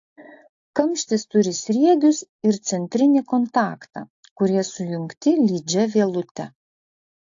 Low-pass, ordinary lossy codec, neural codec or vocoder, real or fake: 7.2 kHz; AAC, 48 kbps; none; real